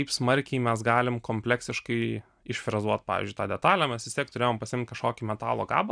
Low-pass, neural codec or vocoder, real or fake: 9.9 kHz; none; real